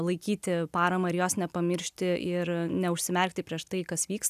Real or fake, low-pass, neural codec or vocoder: real; 14.4 kHz; none